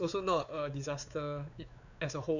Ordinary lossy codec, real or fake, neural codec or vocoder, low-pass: none; fake; codec, 16 kHz, 4 kbps, X-Codec, WavLM features, trained on Multilingual LibriSpeech; 7.2 kHz